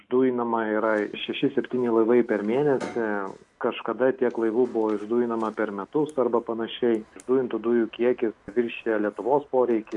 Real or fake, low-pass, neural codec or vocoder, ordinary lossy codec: real; 10.8 kHz; none; MP3, 64 kbps